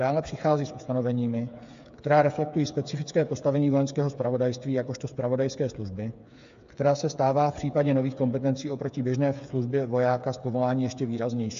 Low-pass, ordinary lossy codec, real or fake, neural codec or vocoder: 7.2 kHz; AAC, 64 kbps; fake; codec, 16 kHz, 8 kbps, FreqCodec, smaller model